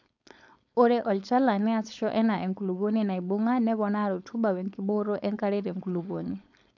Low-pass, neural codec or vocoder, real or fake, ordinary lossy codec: 7.2 kHz; codec, 16 kHz, 4.8 kbps, FACodec; fake; none